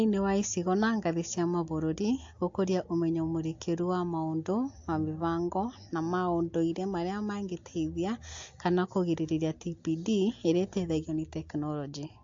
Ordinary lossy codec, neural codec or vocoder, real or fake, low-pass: AAC, 48 kbps; none; real; 7.2 kHz